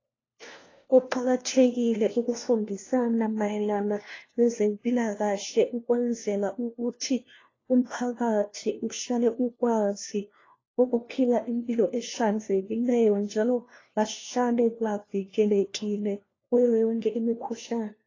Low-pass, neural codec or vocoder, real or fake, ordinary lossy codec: 7.2 kHz; codec, 16 kHz, 1 kbps, FunCodec, trained on LibriTTS, 50 frames a second; fake; AAC, 32 kbps